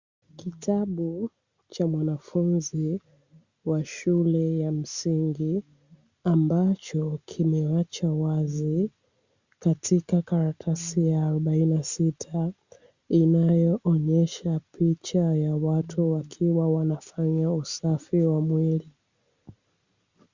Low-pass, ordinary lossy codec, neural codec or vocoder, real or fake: 7.2 kHz; Opus, 64 kbps; none; real